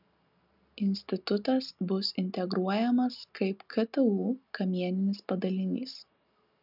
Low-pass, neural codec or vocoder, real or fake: 5.4 kHz; none; real